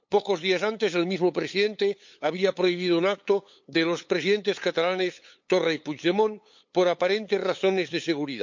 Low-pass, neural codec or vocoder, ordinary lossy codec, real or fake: 7.2 kHz; codec, 16 kHz, 8 kbps, FunCodec, trained on LibriTTS, 25 frames a second; MP3, 48 kbps; fake